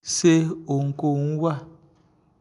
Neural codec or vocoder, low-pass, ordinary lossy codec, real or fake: none; 10.8 kHz; none; real